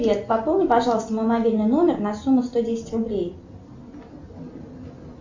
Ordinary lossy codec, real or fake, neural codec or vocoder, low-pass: MP3, 48 kbps; real; none; 7.2 kHz